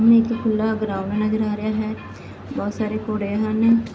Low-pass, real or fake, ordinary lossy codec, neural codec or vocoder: none; real; none; none